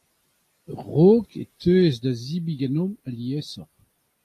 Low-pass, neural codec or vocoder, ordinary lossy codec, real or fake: 14.4 kHz; none; AAC, 48 kbps; real